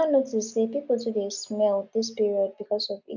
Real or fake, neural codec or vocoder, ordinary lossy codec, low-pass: real; none; none; 7.2 kHz